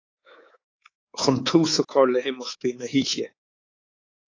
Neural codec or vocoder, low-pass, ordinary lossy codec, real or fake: codec, 24 kHz, 3.1 kbps, DualCodec; 7.2 kHz; AAC, 32 kbps; fake